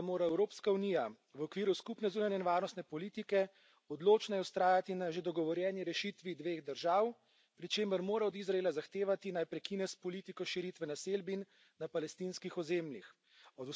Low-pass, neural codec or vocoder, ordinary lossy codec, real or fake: none; none; none; real